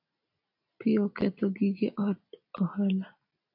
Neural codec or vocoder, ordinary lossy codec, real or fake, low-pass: none; AAC, 48 kbps; real; 5.4 kHz